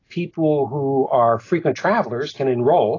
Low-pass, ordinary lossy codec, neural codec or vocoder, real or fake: 7.2 kHz; AAC, 32 kbps; none; real